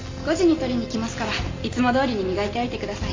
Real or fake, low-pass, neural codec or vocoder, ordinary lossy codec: real; 7.2 kHz; none; MP3, 48 kbps